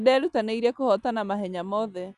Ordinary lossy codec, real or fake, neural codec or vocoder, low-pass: Opus, 64 kbps; real; none; 10.8 kHz